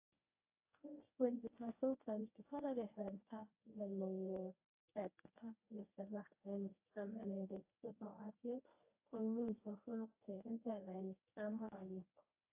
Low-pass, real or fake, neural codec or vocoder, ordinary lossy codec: 3.6 kHz; fake; codec, 24 kHz, 0.9 kbps, WavTokenizer, medium speech release version 1; AAC, 32 kbps